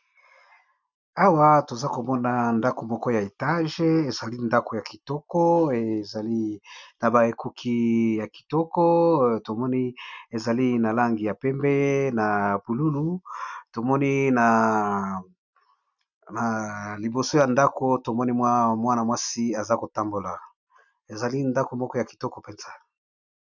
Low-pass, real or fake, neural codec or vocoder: 7.2 kHz; real; none